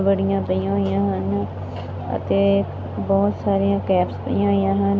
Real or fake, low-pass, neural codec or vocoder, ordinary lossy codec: real; none; none; none